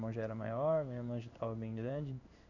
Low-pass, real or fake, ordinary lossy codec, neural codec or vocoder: 7.2 kHz; fake; none; codec, 16 kHz in and 24 kHz out, 1 kbps, XY-Tokenizer